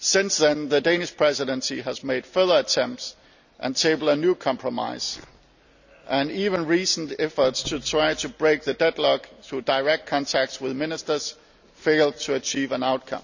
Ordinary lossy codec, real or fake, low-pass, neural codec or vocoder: none; real; 7.2 kHz; none